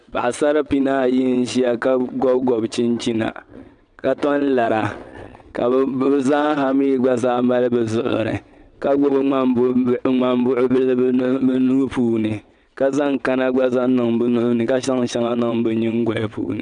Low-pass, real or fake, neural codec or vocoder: 9.9 kHz; fake; vocoder, 22.05 kHz, 80 mel bands, WaveNeXt